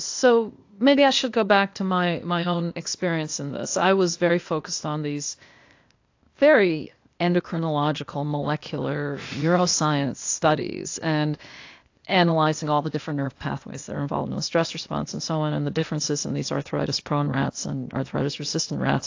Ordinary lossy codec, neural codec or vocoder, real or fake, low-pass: AAC, 48 kbps; codec, 16 kHz, 0.8 kbps, ZipCodec; fake; 7.2 kHz